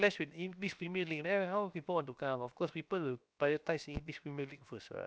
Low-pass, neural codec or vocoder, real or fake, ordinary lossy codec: none; codec, 16 kHz, 0.7 kbps, FocalCodec; fake; none